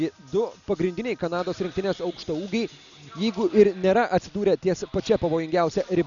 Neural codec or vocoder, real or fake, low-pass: none; real; 7.2 kHz